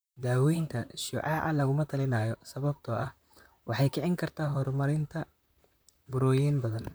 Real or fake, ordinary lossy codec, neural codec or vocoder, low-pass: fake; none; vocoder, 44.1 kHz, 128 mel bands, Pupu-Vocoder; none